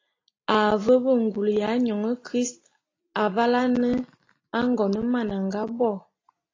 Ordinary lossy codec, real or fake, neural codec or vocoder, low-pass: AAC, 32 kbps; real; none; 7.2 kHz